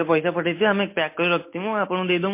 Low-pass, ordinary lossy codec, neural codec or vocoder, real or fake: 3.6 kHz; MP3, 24 kbps; none; real